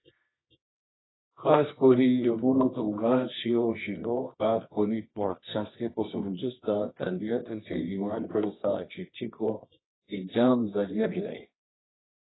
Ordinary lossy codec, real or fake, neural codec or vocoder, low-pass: AAC, 16 kbps; fake; codec, 24 kHz, 0.9 kbps, WavTokenizer, medium music audio release; 7.2 kHz